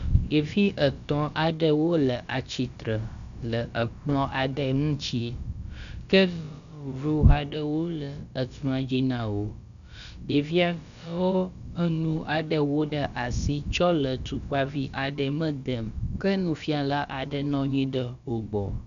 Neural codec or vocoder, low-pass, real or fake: codec, 16 kHz, about 1 kbps, DyCAST, with the encoder's durations; 7.2 kHz; fake